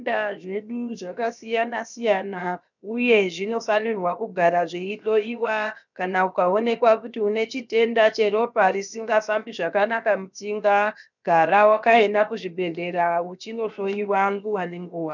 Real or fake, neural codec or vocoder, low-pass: fake; codec, 16 kHz, 0.7 kbps, FocalCodec; 7.2 kHz